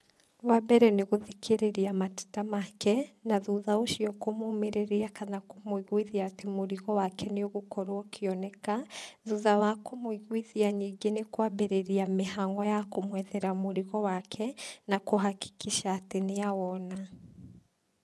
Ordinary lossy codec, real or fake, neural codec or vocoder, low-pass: none; fake; vocoder, 24 kHz, 100 mel bands, Vocos; none